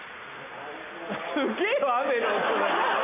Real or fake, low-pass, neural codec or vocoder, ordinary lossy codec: real; 3.6 kHz; none; none